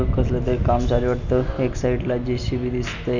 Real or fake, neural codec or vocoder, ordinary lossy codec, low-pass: real; none; none; 7.2 kHz